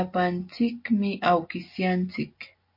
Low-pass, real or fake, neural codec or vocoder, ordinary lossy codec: 5.4 kHz; real; none; MP3, 32 kbps